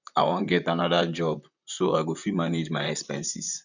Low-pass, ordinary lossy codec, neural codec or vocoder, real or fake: 7.2 kHz; none; vocoder, 44.1 kHz, 128 mel bands, Pupu-Vocoder; fake